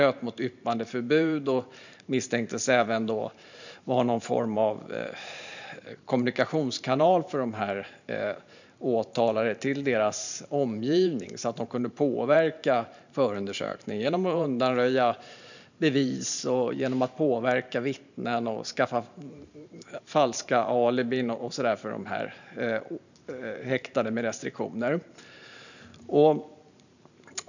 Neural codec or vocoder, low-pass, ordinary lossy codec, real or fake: vocoder, 44.1 kHz, 128 mel bands every 512 samples, BigVGAN v2; 7.2 kHz; none; fake